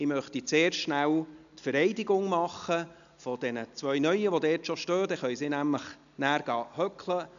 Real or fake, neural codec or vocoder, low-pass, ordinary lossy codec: real; none; 7.2 kHz; none